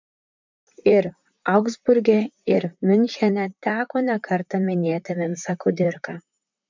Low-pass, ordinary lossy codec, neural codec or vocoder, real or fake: 7.2 kHz; MP3, 64 kbps; vocoder, 44.1 kHz, 128 mel bands, Pupu-Vocoder; fake